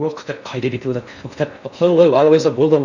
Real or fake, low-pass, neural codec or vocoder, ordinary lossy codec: fake; 7.2 kHz; codec, 16 kHz in and 24 kHz out, 0.6 kbps, FocalCodec, streaming, 4096 codes; none